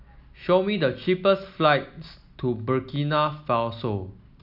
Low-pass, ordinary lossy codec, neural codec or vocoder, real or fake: 5.4 kHz; none; none; real